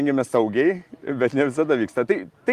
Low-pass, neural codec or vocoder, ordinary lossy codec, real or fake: 14.4 kHz; none; Opus, 24 kbps; real